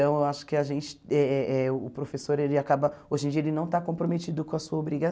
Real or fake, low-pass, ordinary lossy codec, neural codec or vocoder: real; none; none; none